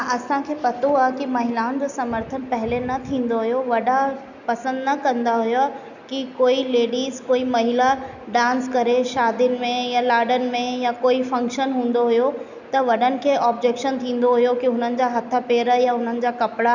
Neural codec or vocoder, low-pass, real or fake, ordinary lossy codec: none; 7.2 kHz; real; none